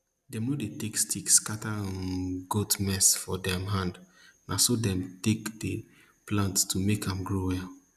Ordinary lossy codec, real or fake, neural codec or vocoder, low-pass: none; real; none; 14.4 kHz